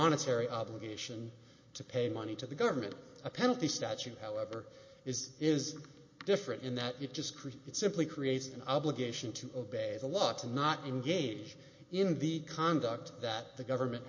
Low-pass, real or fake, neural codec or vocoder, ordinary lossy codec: 7.2 kHz; real; none; MP3, 32 kbps